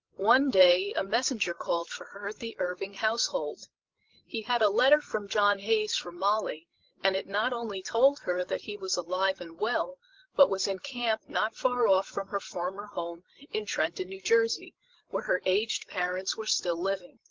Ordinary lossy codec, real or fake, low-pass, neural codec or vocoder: Opus, 16 kbps; fake; 7.2 kHz; codec, 16 kHz, 16 kbps, FreqCodec, larger model